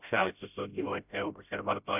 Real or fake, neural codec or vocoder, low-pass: fake; codec, 16 kHz, 0.5 kbps, FreqCodec, smaller model; 3.6 kHz